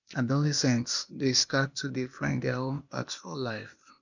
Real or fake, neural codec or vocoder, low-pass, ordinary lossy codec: fake; codec, 16 kHz, 0.8 kbps, ZipCodec; 7.2 kHz; none